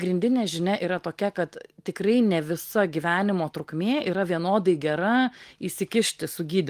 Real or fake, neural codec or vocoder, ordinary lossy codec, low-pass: real; none; Opus, 24 kbps; 14.4 kHz